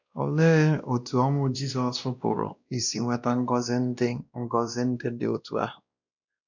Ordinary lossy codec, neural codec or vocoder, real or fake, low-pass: none; codec, 16 kHz, 1 kbps, X-Codec, WavLM features, trained on Multilingual LibriSpeech; fake; 7.2 kHz